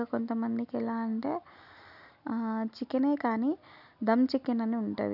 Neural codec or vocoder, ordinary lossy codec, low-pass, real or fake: none; none; 5.4 kHz; real